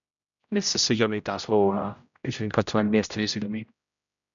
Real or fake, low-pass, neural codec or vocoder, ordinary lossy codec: fake; 7.2 kHz; codec, 16 kHz, 0.5 kbps, X-Codec, HuBERT features, trained on general audio; MP3, 96 kbps